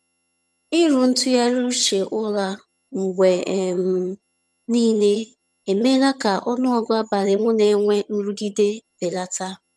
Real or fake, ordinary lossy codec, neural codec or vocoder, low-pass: fake; none; vocoder, 22.05 kHz, 80 mel bands, HiFi-GAN; none